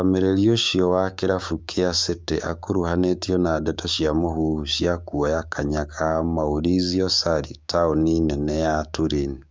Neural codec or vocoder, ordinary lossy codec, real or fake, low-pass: codec, 16 kHz, 6 kbps, DAC; none; fake; none